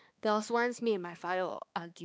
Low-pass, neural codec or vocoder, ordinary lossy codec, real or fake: none; codec, 16 kHz, 2 kbps, X-Codec, HuBERT features, trained on balanced general audio; none; fake